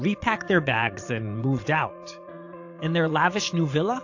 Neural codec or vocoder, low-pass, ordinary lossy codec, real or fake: vocoder, 22.05 kHz, 80 mel bands, Vocos; 7.2 kHz; AAC, 48 kbps; fake